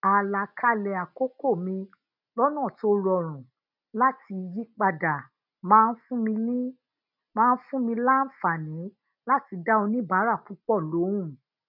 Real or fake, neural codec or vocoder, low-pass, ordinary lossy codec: real; none; 5.4 kHz; none